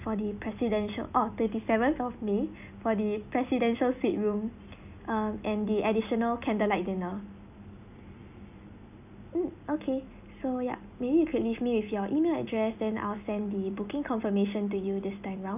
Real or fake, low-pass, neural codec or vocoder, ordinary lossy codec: real; 3.6 kHz; none; none